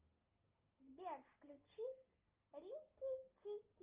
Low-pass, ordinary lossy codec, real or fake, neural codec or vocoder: 3.6 kHz; Opus, 32 kbps; real; none